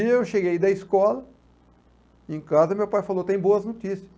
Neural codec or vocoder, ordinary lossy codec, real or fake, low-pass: none; none; real; none